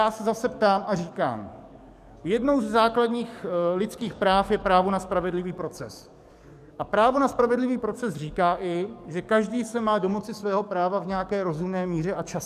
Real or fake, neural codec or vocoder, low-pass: fake; codec, 44.1 kHz, 7.8 kbps, DAC; 14.4 kHz